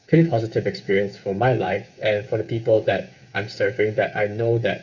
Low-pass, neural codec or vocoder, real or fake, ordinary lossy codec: 7.2 kHz; codec, 16 kHz, 8 kbps, FreqCodec, smaller model; fake; none